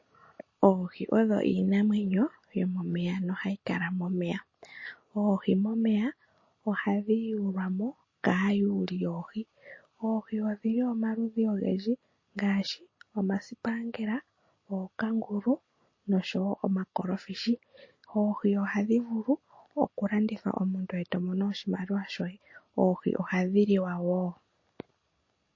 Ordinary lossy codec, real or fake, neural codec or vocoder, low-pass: MP3, 32 kbps; real; none; 7.2 kHz